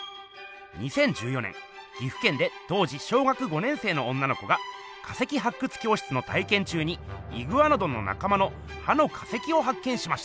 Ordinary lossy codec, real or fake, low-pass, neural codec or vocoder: none; real; none; none